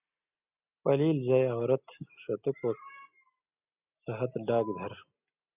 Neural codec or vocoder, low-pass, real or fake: none; 3.6 kHz; real